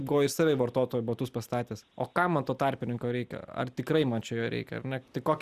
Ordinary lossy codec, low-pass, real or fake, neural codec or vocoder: Opus, 64 kbps; 14.4 kHz; real; none